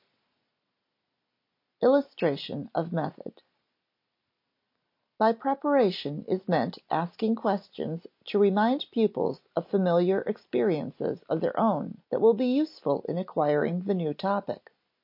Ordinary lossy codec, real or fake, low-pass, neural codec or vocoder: MP3, 32 kbps; real; 5.4 kHz; none